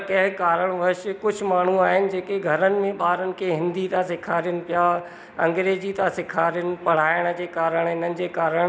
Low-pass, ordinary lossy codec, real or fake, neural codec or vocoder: none; none; real; none